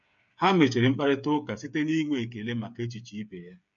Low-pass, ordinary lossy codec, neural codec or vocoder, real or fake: 7.2 kHz; MP3, 64 kbps; codec, 16 kHz, 8 kbps, FreqCodec, smaller model; fake